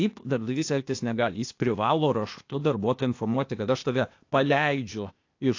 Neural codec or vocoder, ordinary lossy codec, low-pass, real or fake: codec, 16 kHz, 0.8 kbps, ZipCodec; AAC, 48 kbps; 7.2 kHz; fake